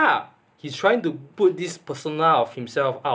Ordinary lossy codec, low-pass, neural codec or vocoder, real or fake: none; none; none; real